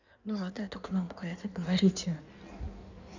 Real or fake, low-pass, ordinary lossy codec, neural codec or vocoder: fake; 7.2 kHz; none; codec, 16 kHz in and 24 kHz out, 1.1 kbps, FireRedTTS-2 codec